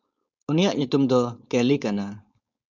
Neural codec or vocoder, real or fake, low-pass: codec, 16 kHz, 4.8 kbps, FACodec; fake; 7.2 kHz